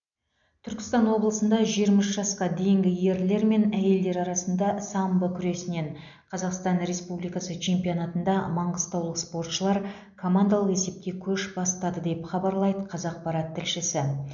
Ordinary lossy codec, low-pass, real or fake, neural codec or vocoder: none; 7.2 kHz; real; none